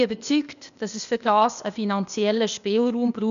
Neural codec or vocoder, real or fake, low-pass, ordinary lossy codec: codec, 16 kHz, 0.8 kbps, ZipCodec; fake; 7.2 kHz; none